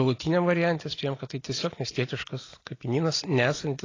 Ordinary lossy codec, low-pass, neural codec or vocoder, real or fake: AAC, 32 kbps; 7.2 kHz; none; real